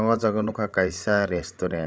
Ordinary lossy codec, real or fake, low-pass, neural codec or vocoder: none; fake; none; codec, 16 kHz, 16 kbps, FreqCodec, larger model